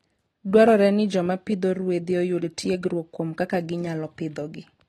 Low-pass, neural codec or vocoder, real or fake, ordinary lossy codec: 19.8 kHz; none; real; AAC, 32 kbps